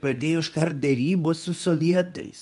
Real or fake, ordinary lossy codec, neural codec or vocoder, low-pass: fake; AAC, 96 kbps; codec, 24 kHz, 0.9 kbps, WavTokenizer, medium speech release version 2; 10.8 kHz